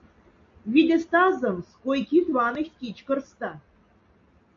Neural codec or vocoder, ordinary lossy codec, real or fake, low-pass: none; MP3, 48 kbps; real; 7.2 kHz